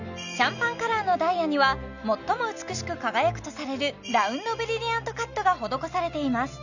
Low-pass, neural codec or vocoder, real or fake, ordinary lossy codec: 7.2 kHz; none; real; none